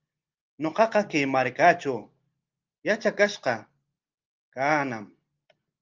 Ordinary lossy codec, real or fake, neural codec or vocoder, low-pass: Opus, 32 kbps; real; none; 7.2 kHz